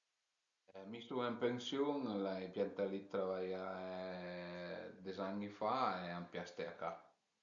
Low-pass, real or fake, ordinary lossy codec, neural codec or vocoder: 7.2 kHz; real; Opus, 64 kbps; none